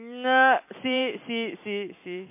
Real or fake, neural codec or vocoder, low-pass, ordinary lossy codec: fake; vocoder, 44.1 kHz, 128 mel bands every 256 samples, BigVGAN v2; 3.6 kHz; MP3, 24 kbps